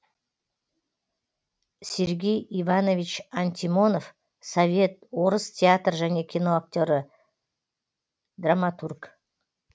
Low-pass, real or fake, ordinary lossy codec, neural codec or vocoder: none; real; none; none